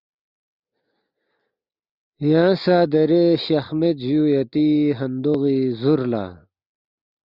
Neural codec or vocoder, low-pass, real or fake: none; 5.4 kHz; real